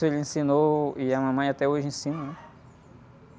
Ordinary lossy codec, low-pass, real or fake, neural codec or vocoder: none; none; real; none